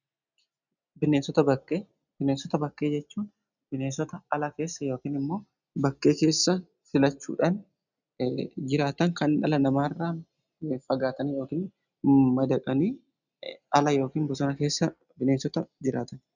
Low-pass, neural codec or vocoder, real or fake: 7.2 kHz; none; real